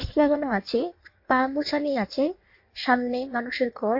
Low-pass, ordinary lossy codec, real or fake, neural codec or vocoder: 5.4 kHz; MP3, 32 kbps; fake; codec, 16 kHz in and 24 kHz out, 1.1 kbps, FireRedTTS-2 codec